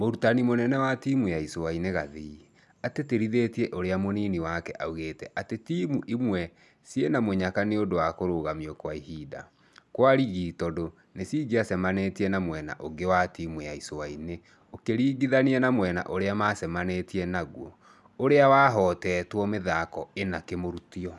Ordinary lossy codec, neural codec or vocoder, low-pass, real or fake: none; none; none; real